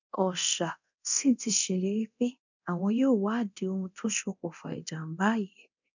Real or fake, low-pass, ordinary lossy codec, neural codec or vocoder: fake; 7.2 kHz; none; codec, 24 kHz, 0.9 kbps, DualCodec